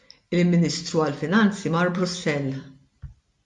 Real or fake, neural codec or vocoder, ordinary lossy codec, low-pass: real; none; MP3, 64 kbps; 10.8 kHz